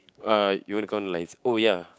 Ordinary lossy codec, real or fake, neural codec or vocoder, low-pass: none; fake; codec, 16 kHz, 6 kbps, DAC; none